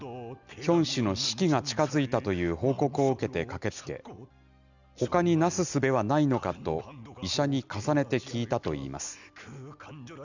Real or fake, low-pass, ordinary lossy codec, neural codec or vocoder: real; 7.2 kHz; none; none